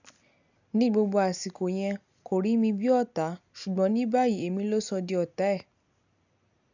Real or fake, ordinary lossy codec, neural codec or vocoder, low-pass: real; none; none; 7.2 kHz